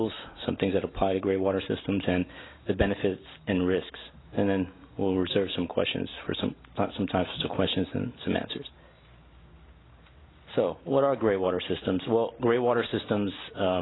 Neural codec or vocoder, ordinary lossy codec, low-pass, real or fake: none; AAC, 16 kbps; 7.2 kHz; real